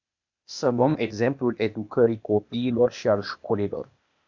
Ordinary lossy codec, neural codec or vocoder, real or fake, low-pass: MP3, 64 kbps; codec, 16 kHz, 0.8 kbps, ZipCodec; fake; 7.2 kHz